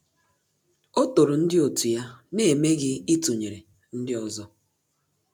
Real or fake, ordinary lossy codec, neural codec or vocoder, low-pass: real; none; none; 19.8 kHz